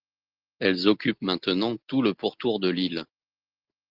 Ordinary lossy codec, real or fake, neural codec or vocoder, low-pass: Opus, 16 kbps; real; none; 5.4 kHz